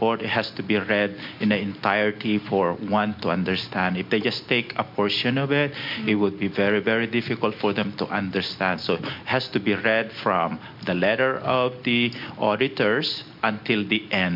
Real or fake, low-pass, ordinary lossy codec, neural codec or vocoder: real; 5.4 kHz; MP3, 48 kbps; none